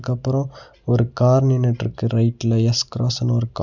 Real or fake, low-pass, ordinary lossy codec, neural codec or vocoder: real; 7.2 kHz; none; none